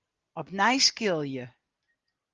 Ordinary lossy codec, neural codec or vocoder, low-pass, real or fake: Opus, 32 kbps; none; 7.2 kHz; real